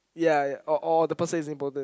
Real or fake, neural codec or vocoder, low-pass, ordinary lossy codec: real; none; none; none